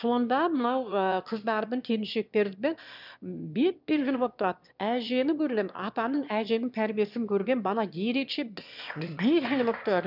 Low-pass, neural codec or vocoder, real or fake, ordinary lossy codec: 5.4 kHz; autoencoder, 22.05 kHz, a latent of 192 numbers a frame, VITS, trained on one speaker; fake; none